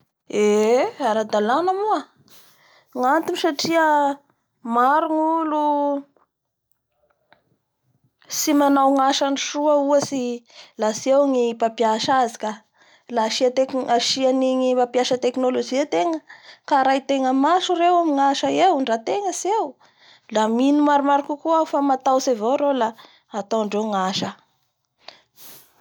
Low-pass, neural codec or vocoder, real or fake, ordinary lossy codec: none; none; real; none